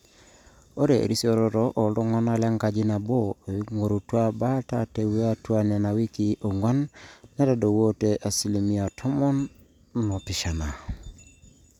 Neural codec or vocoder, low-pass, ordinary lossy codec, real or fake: none; 19.8 kHz; none; real